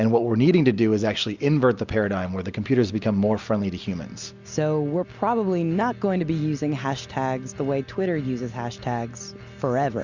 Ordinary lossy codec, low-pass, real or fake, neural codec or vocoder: Opus, 64 kbps; 7.2 kHz; real; none